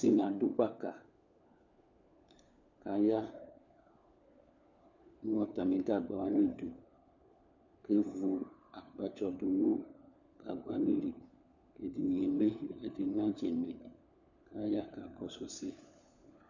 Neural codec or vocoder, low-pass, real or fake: codec, 16 kHz, 4 kbps, FunCodec, trained on LibriTTS, 50 frames a second; 7.2 kHz; fake